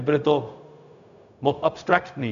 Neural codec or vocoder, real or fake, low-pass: codec, 16 kHz, 0.4 kbps, LongCat-Audio-Codec; fake; 7.2 kHz